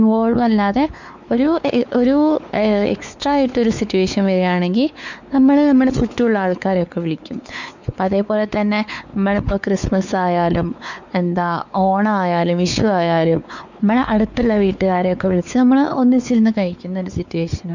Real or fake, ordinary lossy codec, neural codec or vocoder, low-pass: fake; none; codec, 16 kHz, 4 kbps, X-Codec, WavLM features, trained on Multilingual LibriSpeech; 7.2 kHz